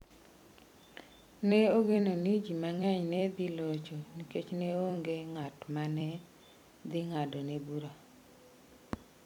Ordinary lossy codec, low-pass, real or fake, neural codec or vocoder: none; 19.8 kHz; fake; vocoder, 44.1 kHz, 128 mel bands every 256 samples, BigVGAN v2